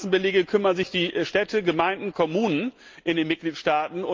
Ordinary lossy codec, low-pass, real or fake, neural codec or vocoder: Opus, 24 kbps; 7.2 kHz; real; none